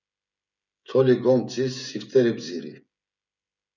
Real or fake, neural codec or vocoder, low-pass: fake; codec, 16 kHz, 16 kbps, FreqCodec, smaller model; 7.2 kHz